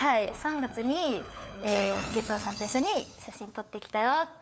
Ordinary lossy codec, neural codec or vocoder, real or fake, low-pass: none; codec, 16 kHz, 4 kbps, FunCodec, trained on LibriTTS, 50 frames a second; fake; none